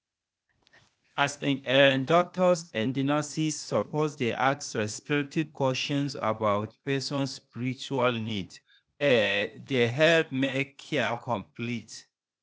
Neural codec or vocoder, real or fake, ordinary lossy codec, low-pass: codec, 16 kHz, 0.8 kbps, ZipCodec; fake; none; none